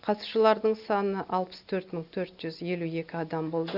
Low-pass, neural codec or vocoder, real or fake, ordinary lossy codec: 5.4 kHz; none; real; none